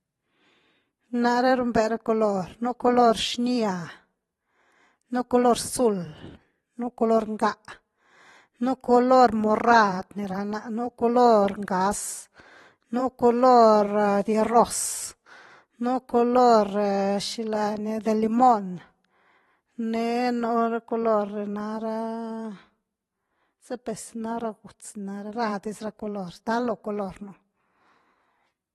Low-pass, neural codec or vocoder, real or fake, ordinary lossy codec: 19.8 kHz; vocoder, 44.1 kHz, 128 mel bands every 512 samples, BigVGAN v2; fake; AAC, 32 kbps